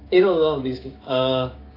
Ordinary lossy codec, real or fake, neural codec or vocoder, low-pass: AAC, 24 kbps; fake; codec, 16 kHz, 6 kbps, DAC; 5.4 kHz